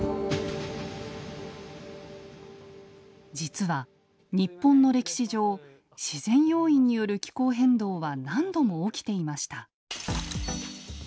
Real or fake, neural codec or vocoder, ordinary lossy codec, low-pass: real; none; none; none